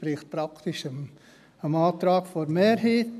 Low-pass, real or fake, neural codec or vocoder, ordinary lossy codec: 14.4 kHz; real; none; none